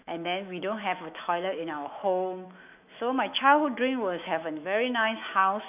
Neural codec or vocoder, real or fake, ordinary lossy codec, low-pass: autoencoder, 48 kHz, 128 numbers a frame, DAC-VAE, trained on Japanese speech; fake; none; 3.6 kHz